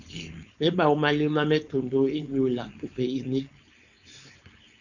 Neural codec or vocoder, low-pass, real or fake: codec, 16 kHz, 4.8 kbps, FACodec; 7.2 kHz; fake